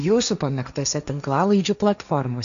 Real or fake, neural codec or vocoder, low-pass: fake; codec, 16 kHz, 1.1 kbps, Voila-Tokenizer; 7.2 kHz